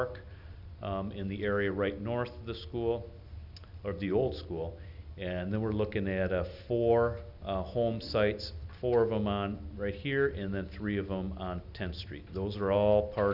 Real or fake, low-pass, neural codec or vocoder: real; 5.4 kHz; none